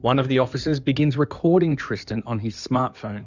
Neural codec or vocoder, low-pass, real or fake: codec, 16 kHz in and 24 kHz out, 2.2 kbps, FireRedTTS-2 codec; 7.2 kHz; fake